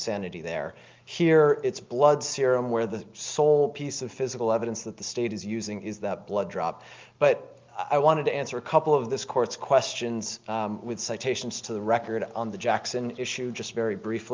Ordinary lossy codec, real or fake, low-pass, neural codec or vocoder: Opus, 24 kbps; real; 7.2 kHz; none